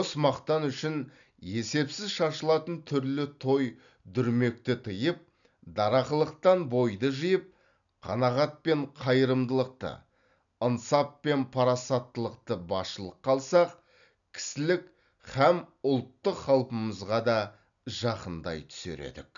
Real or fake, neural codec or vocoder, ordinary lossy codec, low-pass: real; none; none; 7.2 kHz